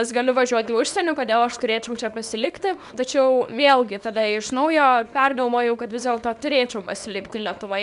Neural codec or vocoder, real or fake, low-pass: codec, 24 kHz, 0.9 kbps, WavTokenizer, small release; fake; 10.8 kHz